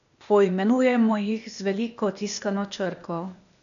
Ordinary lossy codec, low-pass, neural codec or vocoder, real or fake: none; 7.2 kHz; codec, 16 kHz, 0.8 kbps, ZipCodec; fake